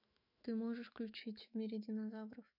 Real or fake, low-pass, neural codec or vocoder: fake; 5.4 kHz; autoencoder, 48 kHz, 128 numbers a frame, DAC-VAE, trained on Japanese speech